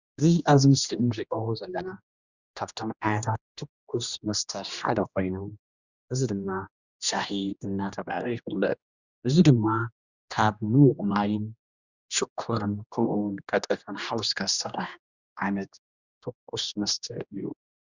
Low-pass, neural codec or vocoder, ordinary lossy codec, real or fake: 7.2 kHz; codec, 16 kHz, 1 kbps, X-Codec, HuBERT features, trained on general audio; Opus, 64 kbps; fake